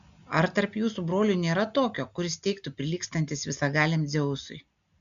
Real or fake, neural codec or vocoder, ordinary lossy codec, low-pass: real; none; MP3, 96 kbps; 7.2 kHz